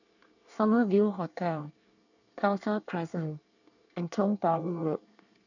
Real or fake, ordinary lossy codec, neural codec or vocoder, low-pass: fake; none; codec, 24 kHz, 1 kbps, SNAC; 7.2 kHz